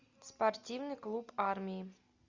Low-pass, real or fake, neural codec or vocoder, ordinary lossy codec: 7.2 kHz; real; none; Opus, 64 kbps